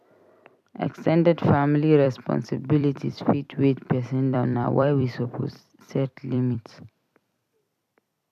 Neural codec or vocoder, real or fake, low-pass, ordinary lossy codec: vocoder, 44.1 kHz, 128 mel bands every 256 samples, BigVGAN v2; fake; 14.4 kHz; none